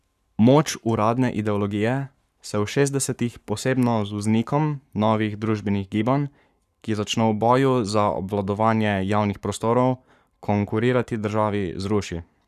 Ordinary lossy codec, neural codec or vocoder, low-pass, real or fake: none; codec, 44.1 kHz, 7.8 kbps, Pupu-Codec; 14.4 kHz; fake